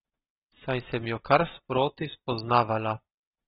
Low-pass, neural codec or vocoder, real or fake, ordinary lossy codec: 7.2 kHz; none; real; AAC, 16 kbps